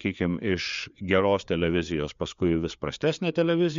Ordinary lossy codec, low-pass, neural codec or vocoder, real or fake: MP3, 64 kbps; 7.2 kHz; codec, 16 kHz, 4 kbps, FreqCodec, larger model; fake